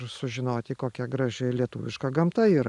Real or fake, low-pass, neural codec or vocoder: real; 10.8 kHz; none